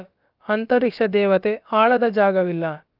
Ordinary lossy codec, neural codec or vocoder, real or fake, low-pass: Opus, 24 kbps; codec, 16 kHz, about 1 kbps, DyCAST, with the encoder's durations; fake; 5.4 kHz